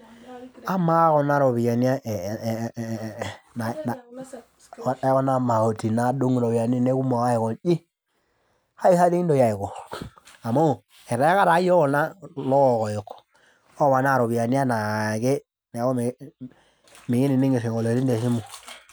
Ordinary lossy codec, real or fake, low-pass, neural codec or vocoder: none; real; none; none